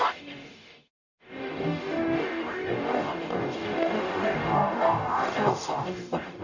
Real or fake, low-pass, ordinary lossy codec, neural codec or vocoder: fake; 7.2 kHz; none; codec, 44.1 kHz, 0.9 kbps, DAC